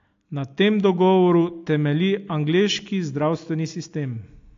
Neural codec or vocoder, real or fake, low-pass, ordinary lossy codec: none; real; 7.2 kHz; AAC, 48 kbps